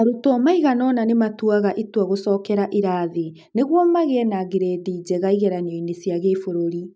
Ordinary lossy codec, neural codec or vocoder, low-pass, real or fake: none; none; none; real